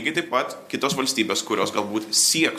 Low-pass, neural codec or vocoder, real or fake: 14.4 kHz; none; real